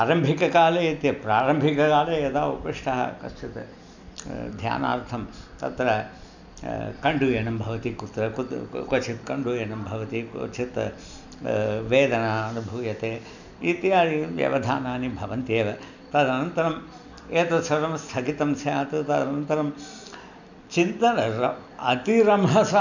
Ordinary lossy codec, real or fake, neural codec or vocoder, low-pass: none; real; none; 7.2 kHz